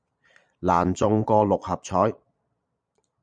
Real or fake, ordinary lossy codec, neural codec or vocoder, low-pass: fake; MP3, 96 kbps; vocoder, 22.05 kHz, 80 mel bands, Vocos; 9.9 kHz